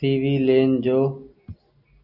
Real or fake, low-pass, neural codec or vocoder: real; 5.4 kHz; none